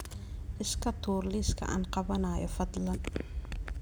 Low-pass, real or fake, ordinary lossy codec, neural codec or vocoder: none; real; none; none